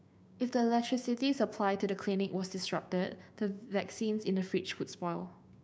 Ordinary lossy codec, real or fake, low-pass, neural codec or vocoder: none; fake; none; codec, 16 kHz, 6 kbps, DAC